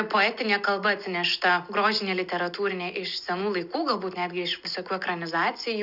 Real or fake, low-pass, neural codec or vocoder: real; 5.4 kHz; none